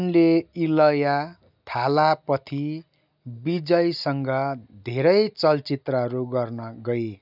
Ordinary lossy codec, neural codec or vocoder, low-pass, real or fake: none; none; 5.4 kHz; real